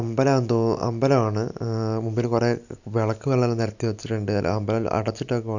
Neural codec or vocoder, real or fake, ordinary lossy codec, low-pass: none; real; none; 7.2 kHz